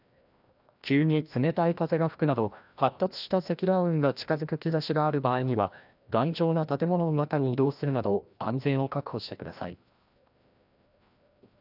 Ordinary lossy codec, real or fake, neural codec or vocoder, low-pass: none; fake; codec, 16 kHz, 1 kbps, FreqCodec, larger model; 5.4 kHz